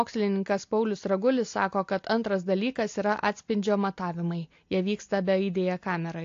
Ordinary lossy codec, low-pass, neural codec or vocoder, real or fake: AAC, 48 kbps; 7.2 kHz; none; real